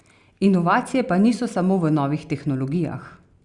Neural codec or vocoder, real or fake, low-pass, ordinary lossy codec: none; real; 10.8 kHz; Opus, 64 kbps